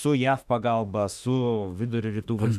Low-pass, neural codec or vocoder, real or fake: 14.4 kHz; autoencoder, 48 kHz, 32 numbers a frame, DAC-VAE, trained on Japanese speech; fake